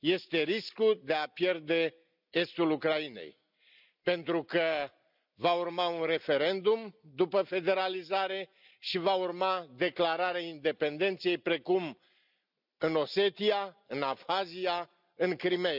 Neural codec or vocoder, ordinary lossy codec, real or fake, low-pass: none; none; real; 5.4 kHz